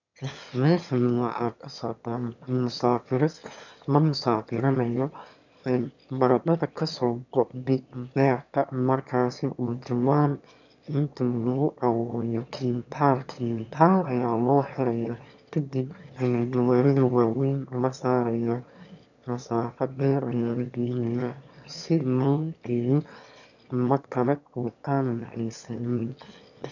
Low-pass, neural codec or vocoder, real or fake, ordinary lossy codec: 7.2 kHz; autoencoder, 22.05 kHz, a latent of 192 numbers a frame, VITS, trained on one speaker; fake; none